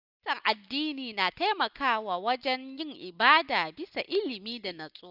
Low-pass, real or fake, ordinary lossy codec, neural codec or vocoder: 5.4 kHz; real; none; none